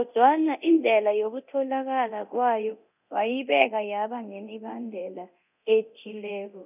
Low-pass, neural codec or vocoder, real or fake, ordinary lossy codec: 3.6 kHz; codec, 24 kHz, 0.9 kbps, DualCodec; fake; none